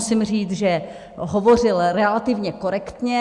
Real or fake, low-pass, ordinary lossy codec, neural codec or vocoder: real; 10.8 kHz; Opus, 64 kbps; none